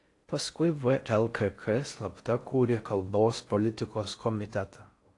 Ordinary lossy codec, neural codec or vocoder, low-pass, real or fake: AAC, 48 kbps; codec, 16 kHz in and 24 kHz out, 0.6 kbps, FocalCodec, streaming, 4096 codes; 10.8 kHz; fake